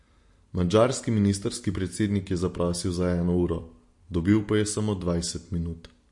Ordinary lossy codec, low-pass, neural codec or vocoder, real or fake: MP3, 48 kbps; 10.8 kHz; none; real